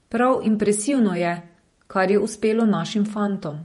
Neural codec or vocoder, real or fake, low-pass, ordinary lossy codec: vocoder, 48 kHz, 128 mel bands, Vocos; fake; 19.8 kHz; MP3, 48 kbps